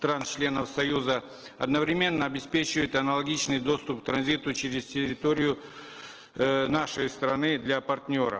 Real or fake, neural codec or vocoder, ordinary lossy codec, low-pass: real; none; Opus, 16 kbps; 7.2 kHz